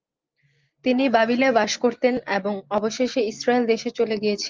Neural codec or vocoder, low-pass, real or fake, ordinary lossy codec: none; 7.2 kHz; real; Opus, 24 kbps